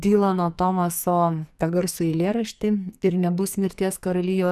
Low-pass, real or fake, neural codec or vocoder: 14.4 kHz; fake; codec, 44.1 kHz, 2.6 kbps, SNAC